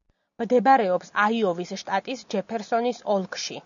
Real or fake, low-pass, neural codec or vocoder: real; 7.2 kHz; none